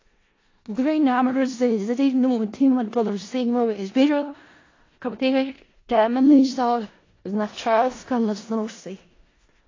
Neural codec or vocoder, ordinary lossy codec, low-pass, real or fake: codec, 16 kHz in and 24 kHz out, 0.4 kbps, LongCat-Audio-Codec, four codebook decoder; AAC, 32 kbps; 7.2 kHz; fake